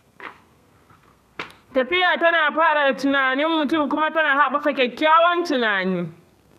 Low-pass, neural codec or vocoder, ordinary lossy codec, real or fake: 14.4 kHz; codec, 32 kHz, 1.9 kbps, SNAC; none; fake